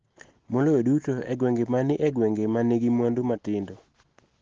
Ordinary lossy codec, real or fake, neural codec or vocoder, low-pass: Opus, 16 kbps; real; none; 7.2 kHz